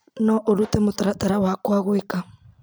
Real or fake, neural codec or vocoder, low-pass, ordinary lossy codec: real; none; none; none